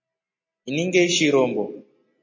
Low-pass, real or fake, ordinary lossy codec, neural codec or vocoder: 7.2 kHz; real; MP3, 32 kbps; none